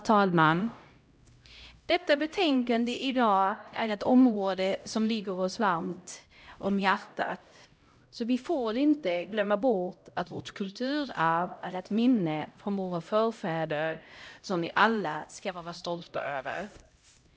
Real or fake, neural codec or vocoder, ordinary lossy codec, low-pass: fake; codec, 16 kHz, 0.5 kbps, X-Codec, HuBERT features, trained on LibriSpeech; none; none